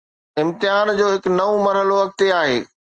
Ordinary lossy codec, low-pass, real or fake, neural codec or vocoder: Opus, 24 kbps; 9.9 kHz; real; none